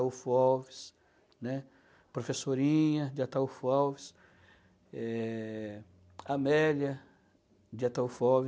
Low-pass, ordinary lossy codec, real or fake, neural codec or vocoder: none; none; real; none